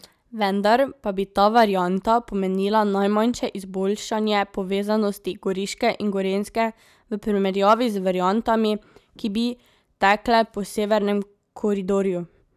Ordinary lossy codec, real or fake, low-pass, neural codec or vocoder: none; real; 14.4 kHz; none